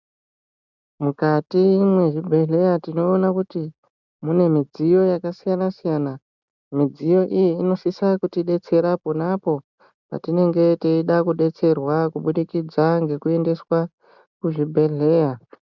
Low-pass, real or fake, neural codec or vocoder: 7.2 kHz; real; none